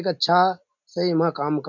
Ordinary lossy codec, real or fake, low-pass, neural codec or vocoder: none; real; 7.2 kHz; none